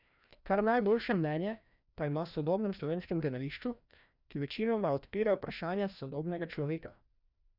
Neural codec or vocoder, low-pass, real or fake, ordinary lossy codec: codec, 16 kHz, 1 kbps, FreqCodec, larger model; 5.4 kHz; fake; none